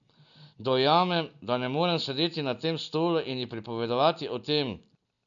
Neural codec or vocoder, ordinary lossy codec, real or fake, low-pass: none; none; real; 7.2 kHz